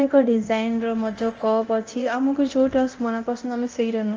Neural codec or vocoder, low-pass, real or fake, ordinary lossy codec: codec, 24 kHz, 0.9 kbps, DualCodec; 7.2 kHz; fake; Opus, 24 kbps